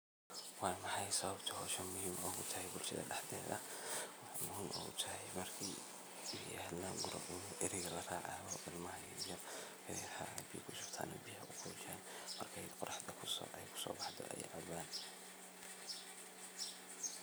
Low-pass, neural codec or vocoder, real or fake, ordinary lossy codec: none; none; real; none